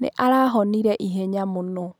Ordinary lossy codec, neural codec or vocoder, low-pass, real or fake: none; none; none; real